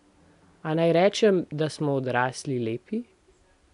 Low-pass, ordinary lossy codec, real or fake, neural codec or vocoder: 10.8 kHz; none; real; none